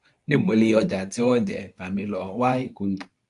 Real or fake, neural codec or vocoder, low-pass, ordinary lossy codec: fake; codec, 24 kHz, 0.9 kbps, WavTokenizer, medium speech release version 2; 10.8 kHz; AAC, 64 kbps